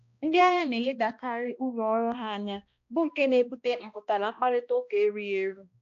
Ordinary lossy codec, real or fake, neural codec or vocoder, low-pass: AAC, 48 kbps; fake; codec, 16 kHz, 1 kbps, X-Codec, HuBERT features, trained on balanced general audio; 7.2 kHz